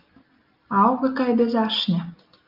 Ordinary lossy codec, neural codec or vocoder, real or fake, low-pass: Opus, 24 kbps; none; real; 5.4 kHz